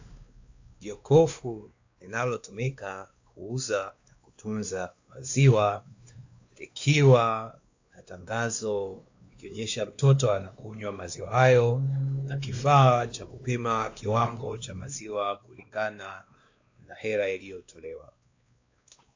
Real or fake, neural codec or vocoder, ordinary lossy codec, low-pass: fake; codec, 16 kHz, 2 kbps, X-Codec, WavLM features, trained on Multilingual LibriSpeech; AAC, 48 kbps; 7.2 kHz